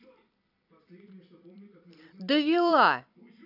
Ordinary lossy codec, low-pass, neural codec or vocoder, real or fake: none; 5.4 kHz; vocoder, 44.1 kHz, 128 mel bands every 256 samples, BigVGAN v2; fake